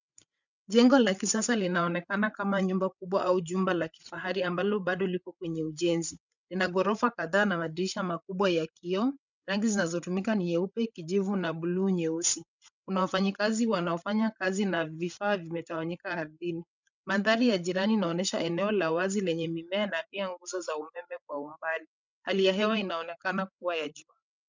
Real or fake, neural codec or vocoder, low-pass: fake; codec, 16 kHz, 8 kbps, FreqCodec, larger model; 7.2 kHz